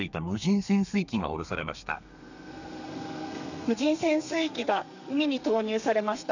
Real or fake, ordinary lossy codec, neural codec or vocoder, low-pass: fake; none; codec, 32 kHz, 1.9 kbps, SNAC; 7.2 kHz